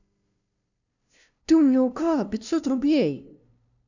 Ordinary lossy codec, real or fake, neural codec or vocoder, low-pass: none; fake; codec, 16 kHz, 0.5 kbps, FunCodec, trained on LibriTTS, 25 frames a second; 7.2 kHz